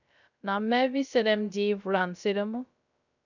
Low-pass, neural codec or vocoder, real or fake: 7.2 kHz; codec, 16 kHz, 0.3 kbps, FocalCodec; fake